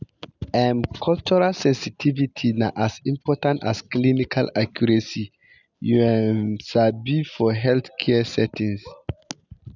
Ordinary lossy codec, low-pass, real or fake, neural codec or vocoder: none; 7.2 kHz; real; none